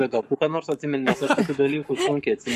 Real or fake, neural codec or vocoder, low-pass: fake; codec, 44.1 kHz, 7.8 kbps, Pupu-Codec; 14.4 kHz